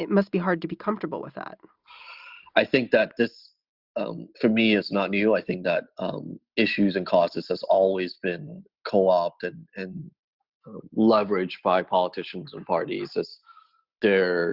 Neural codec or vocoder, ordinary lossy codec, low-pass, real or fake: none; Opus, 64 kbps; 5.4 kHz; real